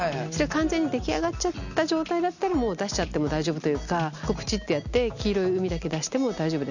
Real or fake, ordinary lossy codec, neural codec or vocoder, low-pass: real; none; none; 7.2 kHz